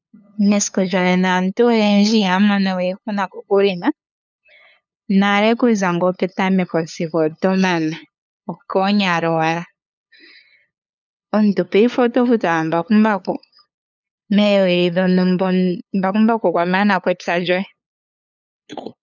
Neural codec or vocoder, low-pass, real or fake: codec, 16 kHz, 2 kbps, FunCodec, trained on LibriTTS, 25 frames a second; 7.2 kHz; fake